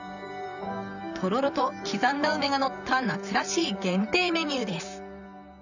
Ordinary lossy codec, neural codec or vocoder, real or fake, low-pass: none; vocoder, 44.1 kHz, 128 mel bands, Pupu-Vocoder; fake; 7.2 kHz